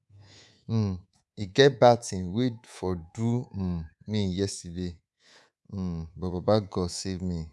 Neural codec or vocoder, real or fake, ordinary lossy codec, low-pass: codec, 24 kHz, 3.1 kbps, DualCodec; fake; none; none